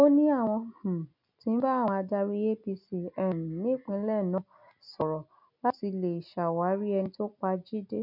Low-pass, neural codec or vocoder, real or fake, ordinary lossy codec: 5.4 kHz; none; real; none